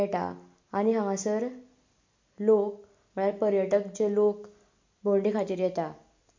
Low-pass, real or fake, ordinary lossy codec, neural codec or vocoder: 7.2 kHz; real; MP3, 48 kbps; none